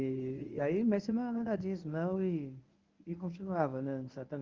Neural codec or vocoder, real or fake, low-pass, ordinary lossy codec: codec, 24 kHz, 0.9 kbps, WavTokenizer, medium speech release version 1; fake; 7.2 kHz; Opus, 24 kbps